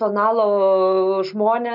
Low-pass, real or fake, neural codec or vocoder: 5.4 kHz; real; none